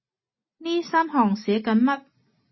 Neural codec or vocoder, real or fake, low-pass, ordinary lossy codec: none; real; 7.2 kHz; MP3, 24 kbps